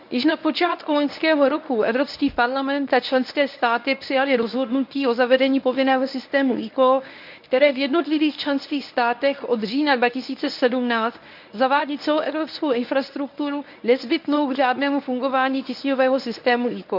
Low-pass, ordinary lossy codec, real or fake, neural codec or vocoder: 5.4 kHz; none; fake; codec, 24 kHz, 0.9 kbps, WavTokenizer, medium speech release version 1